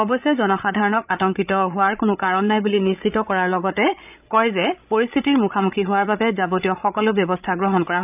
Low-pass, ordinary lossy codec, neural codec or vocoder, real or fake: 3.6 kHz; none; codec, 16 kHz, 16 kbps, FreqCodec, larger model; fake